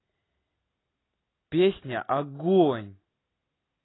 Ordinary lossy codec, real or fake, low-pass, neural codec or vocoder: AAC, 16 kbps; real; 7.2 kHz; none